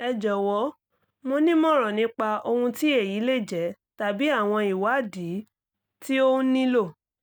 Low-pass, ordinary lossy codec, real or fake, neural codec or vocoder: none; none; real; none